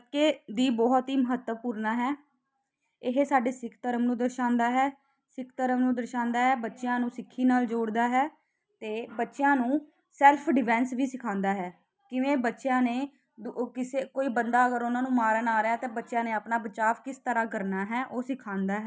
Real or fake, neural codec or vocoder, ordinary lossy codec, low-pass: real; none; none; none